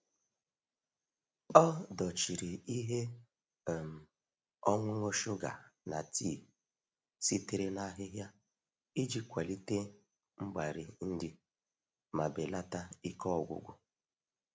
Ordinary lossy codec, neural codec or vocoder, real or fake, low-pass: none; none; real; none